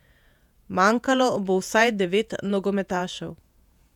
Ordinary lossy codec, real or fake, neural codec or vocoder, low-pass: none; fake; vocoder, 48 kHz, 128 mel bands, Vocos; 19.8 kHz